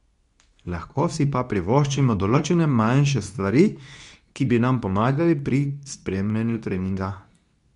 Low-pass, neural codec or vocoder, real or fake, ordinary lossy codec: 10.8 kHz; codec, 24 kHz, 0.9 kbps, WavTokenizer, medium speech release version 2; fake; none